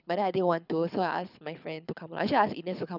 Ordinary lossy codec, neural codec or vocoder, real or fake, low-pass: none; codec, 24 kHz, 6 kbps, HILCodec; fake; 5.4 kHz